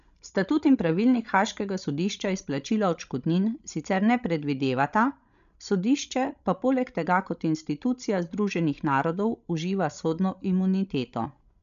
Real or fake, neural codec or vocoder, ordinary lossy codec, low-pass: fake; codec, 16 kHz, 16 kbps, FreqCodec, larger model; none; 7.2 kHz